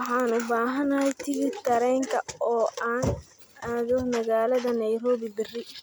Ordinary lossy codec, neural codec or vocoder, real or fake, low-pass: none; none; real; none